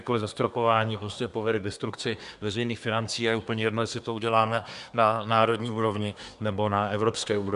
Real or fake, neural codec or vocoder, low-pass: fake; codec, 24 kHz, 1 kbps, SNAC; 10.8 kHz